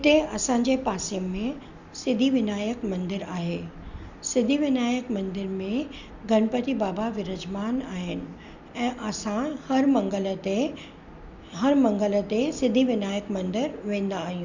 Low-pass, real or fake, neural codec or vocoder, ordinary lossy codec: 7.2 kHz; real; none; none